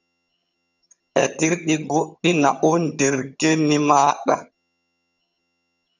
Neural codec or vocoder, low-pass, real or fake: vocoder, 22.05 kHz, 80 mel bands, HiFi-GAN; 7.2 kHz; fake